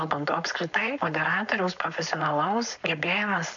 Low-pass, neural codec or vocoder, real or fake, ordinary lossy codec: 7.2 kHz; codec, 16 kHz, 4.8 kbps, FACodec; fake; AAC, 64 kbps